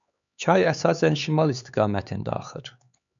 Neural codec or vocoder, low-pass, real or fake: codec, 16 kHz, 4 kbps, X-Codec, HuBERT features, trained on LibriSpeech; 7.2 kHz; fake